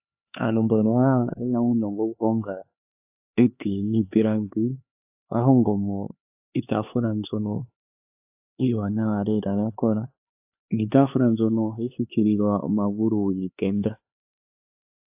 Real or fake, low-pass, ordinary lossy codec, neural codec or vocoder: fake; 3.6 kHz; AAC, 32 kbps; codec, 16 kHz, 4 kbps, X-Codec, HuBERT features, trained on LibriSpeech